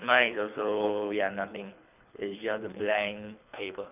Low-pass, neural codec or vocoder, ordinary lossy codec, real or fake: 3.6 kHz; codec, 24 kHz, 3 kbps, HILCodec; none; fake